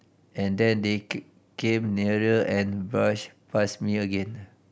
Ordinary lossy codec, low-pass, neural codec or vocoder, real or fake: none; none; none; real